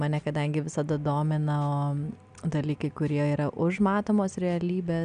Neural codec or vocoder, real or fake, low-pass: none; real; 9.9 kHz